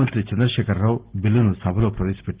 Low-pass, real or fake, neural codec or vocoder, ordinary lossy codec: 3.6 kHz; real; none; Opus, 16 kbps